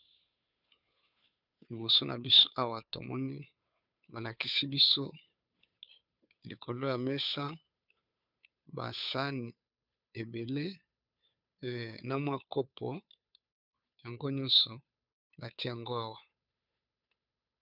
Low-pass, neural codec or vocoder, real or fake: 5.4 kHz; codec, 16 kHz, 2 kbps, FunCodec, trained on Chinese and English, 25 frames a second; fake